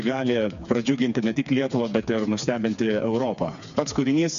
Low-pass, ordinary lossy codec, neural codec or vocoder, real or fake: 7.2 kHz; MP3, 64 kbps; codec, 16 kHz, 4 kbps, FreqCodec, smaller model; fake